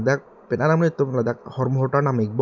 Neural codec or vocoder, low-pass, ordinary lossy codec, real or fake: none; 7.2 kHz; none; real